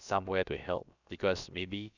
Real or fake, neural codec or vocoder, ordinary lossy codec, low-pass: fake; codec, 16 kHz, about 1 kbps, DyCAST, with the encoder's durations; none; 7.2 kHz